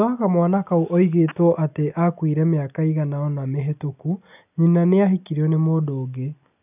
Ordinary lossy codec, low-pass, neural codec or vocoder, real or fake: none; 3.6 kHz; none; real